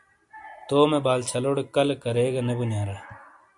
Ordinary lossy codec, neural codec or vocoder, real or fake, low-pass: AAC, 64 kbps; none; real; 10.8 kHz